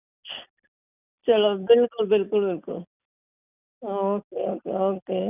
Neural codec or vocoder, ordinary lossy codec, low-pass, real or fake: none; none; 3.6 kHz; real